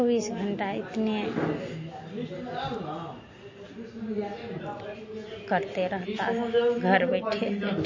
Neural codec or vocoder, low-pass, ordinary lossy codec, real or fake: none; 7.2 kHz; MP3, 32 kbps; real